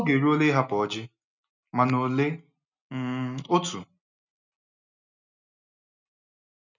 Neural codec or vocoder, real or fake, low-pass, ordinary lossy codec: none; real; 7.2 kHz; none